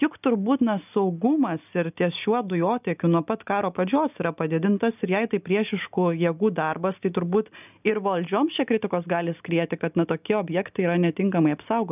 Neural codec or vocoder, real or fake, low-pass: none; real; 3.6 kHz